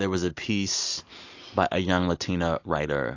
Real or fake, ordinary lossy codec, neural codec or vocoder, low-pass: real; AAC, 48 kbps; none; 7.2 kHz